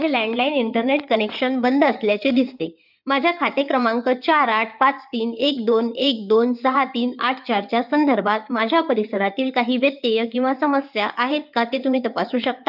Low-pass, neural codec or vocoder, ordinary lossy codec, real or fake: 5.4 kHz; codec, 16 kHz in and 24 kHz out, 2.2 kbps, FireRedTTS-2 codec; none; fake